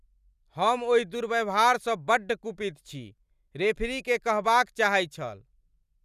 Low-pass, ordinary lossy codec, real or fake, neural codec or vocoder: 14.4 kHz; none; real; none